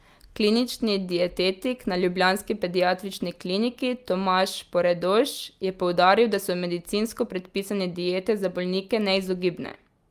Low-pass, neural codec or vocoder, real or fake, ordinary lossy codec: 14.4 kHz; none; real; Opus, 32 kbps